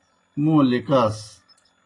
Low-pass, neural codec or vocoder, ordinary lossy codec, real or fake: 10.8 kHz; none; AAC, 32 kbps; real